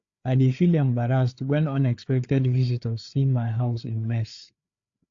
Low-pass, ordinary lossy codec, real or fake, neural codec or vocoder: 7.2 kHz; AAC, 48 kbps; fake; codec, 16 kHz, 4 kbps, FreqCodec, larger model